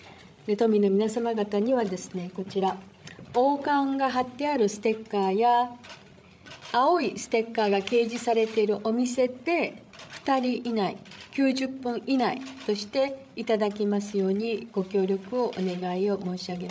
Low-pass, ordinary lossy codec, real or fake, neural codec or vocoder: none; none; fake; codec, 16 kHz, 16 kbps, FreqCodec, larger model